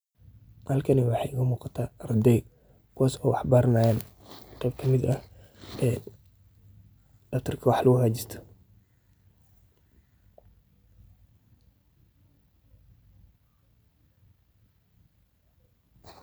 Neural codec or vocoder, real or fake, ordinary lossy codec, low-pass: none; real; none; none